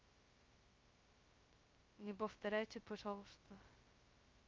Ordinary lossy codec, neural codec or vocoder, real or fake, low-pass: Opus, 32 kbps; codec, 16 kHz, 0.2 kbps, FocalCodec; fake; 7.2 kHz